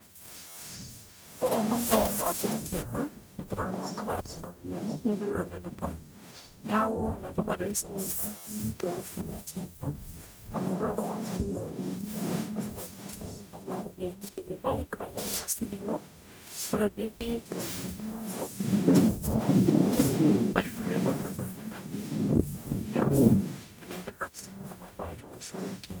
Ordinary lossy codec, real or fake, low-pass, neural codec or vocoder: none; fake; none; codec, 44.1 kHz, 0.9 kbps, DAC